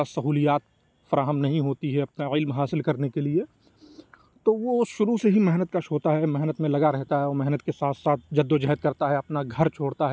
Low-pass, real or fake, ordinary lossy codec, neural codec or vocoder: none; real; none; none